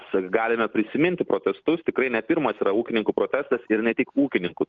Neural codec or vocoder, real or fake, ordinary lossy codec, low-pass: none; real; Opus, 24 kbps; 7.2 kHz